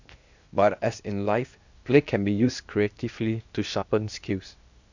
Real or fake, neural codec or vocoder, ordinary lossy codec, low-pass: fake; codec, 16 kHz, 0.8 kbps, ZipCodec; none; 7.2 kHz